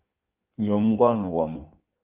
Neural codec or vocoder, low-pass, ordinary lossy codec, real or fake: codec, 16 kHz in and 24 kHz out, 1.1 kbps, FireRedTTS-2 codec; 3.6 kHz; Opus, 32 kbps; fake